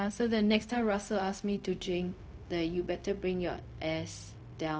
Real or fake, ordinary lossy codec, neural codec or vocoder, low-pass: fake; none; codec, 16 kHz, 0.4 kbps, LongCat-Audio-Codec; none